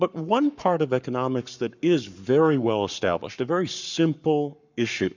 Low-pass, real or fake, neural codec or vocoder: 7.2 kHz; fake; codec, 44.1 kHz, 7.8 kbps, Pupu-Codec